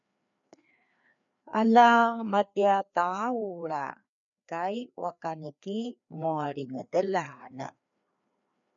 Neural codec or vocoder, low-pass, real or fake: codec, 16 kHz, 2 kbps, FreqCodec, larger model; 7.2 kHz; fake